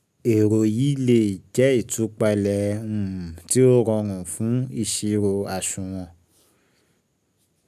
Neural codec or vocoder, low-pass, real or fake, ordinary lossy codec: none; 14.4 kHz; real; none